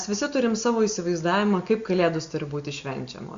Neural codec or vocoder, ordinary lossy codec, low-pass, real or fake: none; Opus, 64 kbps; 7.2 kHz; real